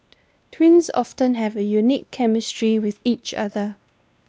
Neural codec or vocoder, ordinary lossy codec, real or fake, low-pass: codec, 16 kHz, 1 kbps, X-Codec, WavLM features, trained on Multilingual LibriSpeech; none; fake; none